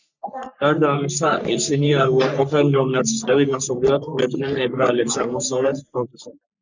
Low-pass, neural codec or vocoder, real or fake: 7.2 kHz; codec, 44.1 kHz, 3.4 kbps, Pupu-Codec; fake